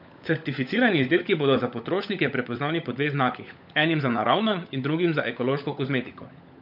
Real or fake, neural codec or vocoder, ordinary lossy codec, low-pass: fake; codec, 16 kHz, 16 kbps, FunCodec, trained on LibriTTS, 50 frames a second; none; 5.4 kHz